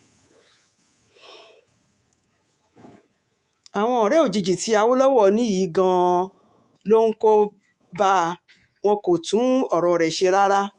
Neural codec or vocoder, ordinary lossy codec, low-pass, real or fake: codec, 24 kHz, 3.1 kbps, DualCodec; Opus, 64 kbps; 10.8 kHz; fake